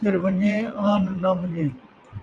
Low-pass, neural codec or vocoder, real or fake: 9.9 kHz; vocoder, 22.05 kHz, 80 mel bands, WaveNeXt; fake